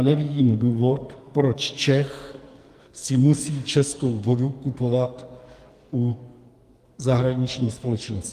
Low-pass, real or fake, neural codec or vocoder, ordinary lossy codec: 14.4 kHz; fake; codec, 44.1 kHz, 2.6 kbps, SNAC; Opus, 32 kbps